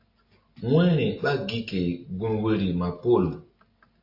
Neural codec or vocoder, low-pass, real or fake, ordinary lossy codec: none; 5.4 kHz; real; AAC, 32 kbps